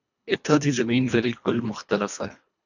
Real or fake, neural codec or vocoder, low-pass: fake; codec, 24 kHz, 1.5 kbps, HILCodec; 7.2 kHz